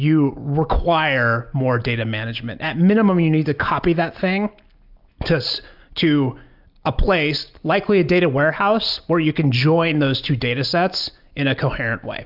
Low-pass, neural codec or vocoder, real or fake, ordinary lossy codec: 5.4 kHz; none; real; AAC, 48 kbps